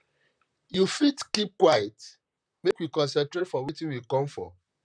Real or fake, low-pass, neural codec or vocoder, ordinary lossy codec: fake; 9.9 kHz; vocoder, 44.1 kHz, 128 mel bands, Pupu-Vocoder; none